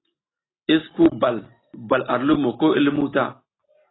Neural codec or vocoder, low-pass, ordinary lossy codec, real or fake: none; 7.2 kHz; AAC, 16 kbps; real